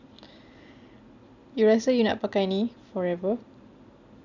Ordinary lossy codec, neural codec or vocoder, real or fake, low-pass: none; none; real; 7.2 kHz